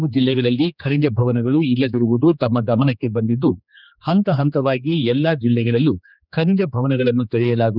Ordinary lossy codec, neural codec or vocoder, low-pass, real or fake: none; codec, 16 kHz, 2 kbps, X-Codec, HuBERT features, trained on general audio; 5.4 kHz; fake